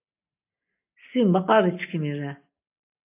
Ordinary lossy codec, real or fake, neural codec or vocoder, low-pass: AAC, 24 kbps; real; none; 3.6 kHz